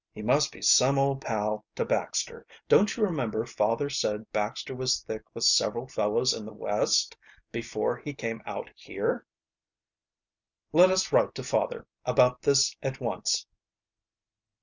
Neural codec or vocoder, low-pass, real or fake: none; 7.2 kHz; real